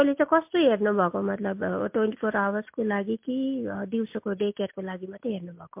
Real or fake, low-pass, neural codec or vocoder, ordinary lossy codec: real; 3.6 kHz; none; MP3, 32 kbps